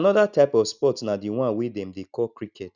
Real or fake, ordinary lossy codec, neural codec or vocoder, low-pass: real; none; none; 7.2 kHz